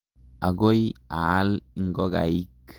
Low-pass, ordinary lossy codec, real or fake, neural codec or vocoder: 19.8 kHz; Opus, 24 kbps; fake; vocoder, 48 kHz, 128 mel bands, Vocos